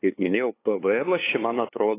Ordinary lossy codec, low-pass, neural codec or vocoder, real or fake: AAC, 16 kbps; 3.6 kHz; codec, 16 kHz, 2 kbps, FunCodec, trained on LibriTTS, 25 frames a second; fake